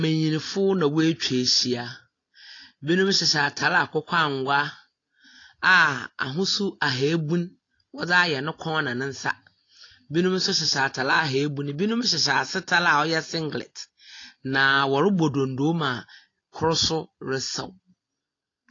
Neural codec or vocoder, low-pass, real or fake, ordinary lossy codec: none; 7.2 kHz; real; AAC, 32 kbps